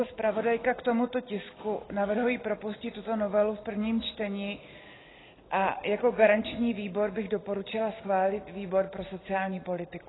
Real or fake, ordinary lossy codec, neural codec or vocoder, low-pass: real; AAC, 16 kbps; none; 7.2 kHz